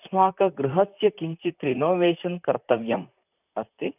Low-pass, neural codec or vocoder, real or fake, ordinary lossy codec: 3.6 kHz; vocoder, 44.1 kHz, 128 mel bands, Pupu-Vocoder; fake; none